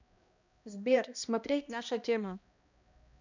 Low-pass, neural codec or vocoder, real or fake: 7.2 kHz; codec, 16 kHz, 1 kbps, X-Codec, HuBERT features, trained on balanced general audio; fake